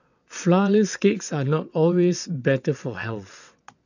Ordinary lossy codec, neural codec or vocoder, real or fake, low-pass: none; vocoder, 22.05 kHz, 80 mel bands, WaveNeXt; fake; 7.2 kHz